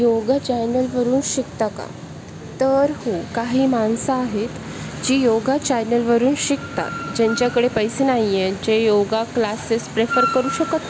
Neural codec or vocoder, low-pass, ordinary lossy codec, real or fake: none; none; none; real